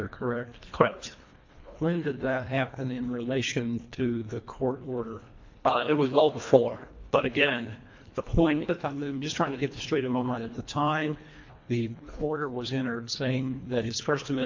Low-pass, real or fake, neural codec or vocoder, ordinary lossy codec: 7.2 kHz; fake; codec, 24 kHz, 1.5 kbps, HILCodec; MP3, 48 kbps